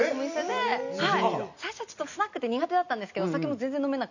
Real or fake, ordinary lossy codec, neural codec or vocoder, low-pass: real; none; none; 7.2 kHz